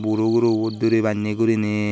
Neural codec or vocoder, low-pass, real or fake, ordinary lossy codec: none; none; real; none